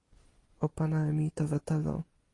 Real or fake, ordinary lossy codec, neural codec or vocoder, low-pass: real; AAC, 32 kbps; none; 10.8 kHz